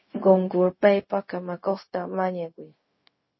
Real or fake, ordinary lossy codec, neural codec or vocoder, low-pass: fake; MP3, 24 kbps; codec, 16 kHz, 0.4 kbps, LongCat-Audio-Codec; 7.2 kHz